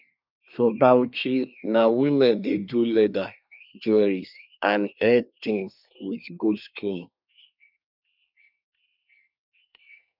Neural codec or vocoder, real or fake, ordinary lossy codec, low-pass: codec, 24 kHz, 1 kbps, SNAC; fake; none; 5.4 kHz